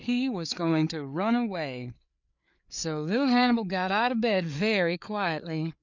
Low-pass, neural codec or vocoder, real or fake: 7.2 kHz; codec, 16 kHz, 4 kbps, FreqCodec, larger model; fake